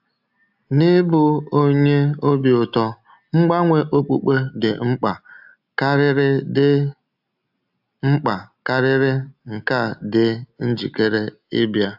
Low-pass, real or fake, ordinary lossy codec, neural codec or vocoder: 5.4 kHz; real; AAC, 48 kbps; none